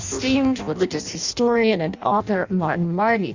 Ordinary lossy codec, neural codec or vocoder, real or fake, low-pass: Opus, 64 kbps; codec, 16 kHz in and 24 kHz out, 0.6 kbps, FireRedTTS-2 codec; fake; 7.2 kHz